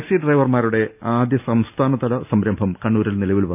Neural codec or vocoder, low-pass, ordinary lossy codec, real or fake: none; 3.6 kHz; none; real